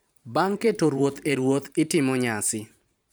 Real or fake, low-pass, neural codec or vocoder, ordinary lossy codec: fake; none; vocoder, 44.1 kHz, 128 mel bands every 512 samples, BigVGAN v2; none